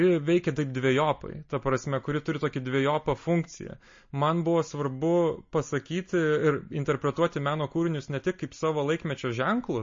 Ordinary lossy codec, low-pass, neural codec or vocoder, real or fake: MP3, 32 kbps; 7.2 kHz; none; real